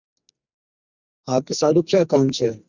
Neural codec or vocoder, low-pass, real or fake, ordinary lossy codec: codec, 44.1 kHz, 2.6 kbps, SNAC; 7.2 kHz; fake; Opus, 64 kbps